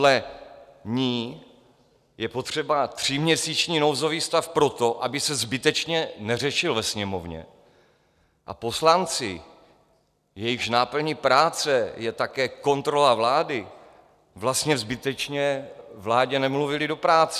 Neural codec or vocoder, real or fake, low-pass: none; real; 14.4 kHz